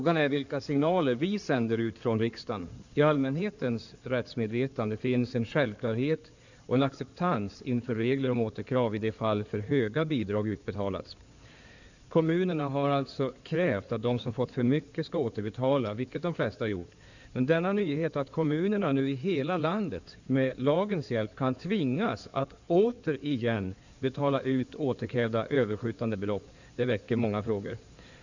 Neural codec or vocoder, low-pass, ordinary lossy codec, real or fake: codec, 16 kHz in and 24 kHz out, 2.2 kbps, FireRedTTS-2 codec; 7.2 kHz; none; fake